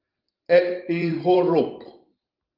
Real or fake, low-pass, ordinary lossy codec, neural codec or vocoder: fake; 5.4 kHz; Opus, 32 kbps; vocoder, 44.1 kHz, 128 mel bands every 512 samples, BigVGAN v2